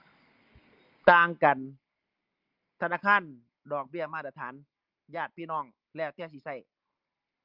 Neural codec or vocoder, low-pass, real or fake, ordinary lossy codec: none; 5.4 kHz; real; Opus, 24 kbps